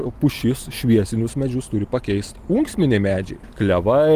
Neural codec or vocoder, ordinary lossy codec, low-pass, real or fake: none; Opus, 24 kbps; 14.4 kHz; real